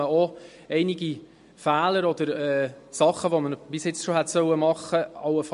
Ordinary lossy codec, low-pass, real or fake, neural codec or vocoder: MP3, 48 kbps; 10.8 kHz; real; none